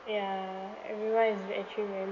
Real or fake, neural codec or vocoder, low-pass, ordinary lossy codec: real; none; 7.2 kHz; none